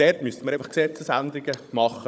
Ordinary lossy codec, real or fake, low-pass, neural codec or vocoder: none; fake; none; codec, 16 kHz, 16 kbps, FunCodec, trained on Chinese and English, 50 frames a second